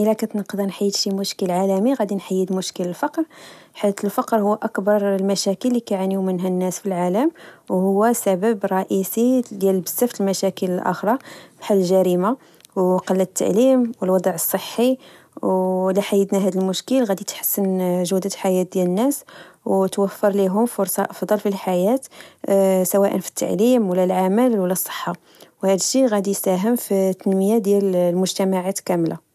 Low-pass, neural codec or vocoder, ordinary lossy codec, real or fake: 14.4 kHz; none; none; real